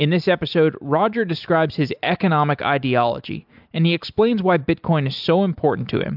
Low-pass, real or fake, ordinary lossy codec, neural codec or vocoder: 5.4 kHz; real; AAC, 48 kbps; none